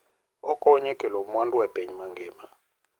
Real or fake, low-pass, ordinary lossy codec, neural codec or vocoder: fake; 19.8 kHz; Opus, 32 kbps; codec, 44.1 kHz, 7.8 kbps, DAC